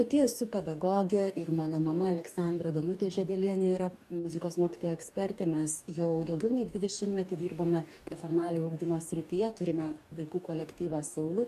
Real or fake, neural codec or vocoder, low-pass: fake; codec, 44.1 kHz, 2.6 kbps, DAC; 14.4 kHz